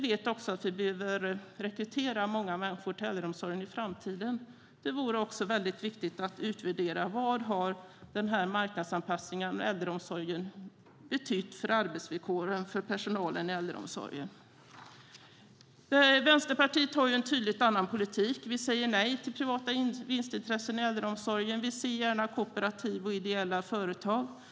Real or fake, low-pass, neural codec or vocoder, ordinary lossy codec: real; none; none; none